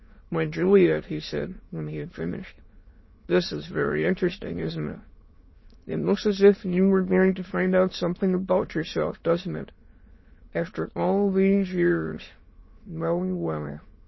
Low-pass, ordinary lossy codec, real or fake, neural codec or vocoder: 7.2 kHz; MP3, 24 kbps; fake; autoencoder, 22.05 kHz, a latent of 192 numbers a frame, VITS, trained on many speakers